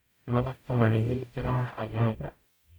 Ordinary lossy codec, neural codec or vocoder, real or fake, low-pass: none; codec, 44.1 kHz, 0.9 kbps, DAC; fake; none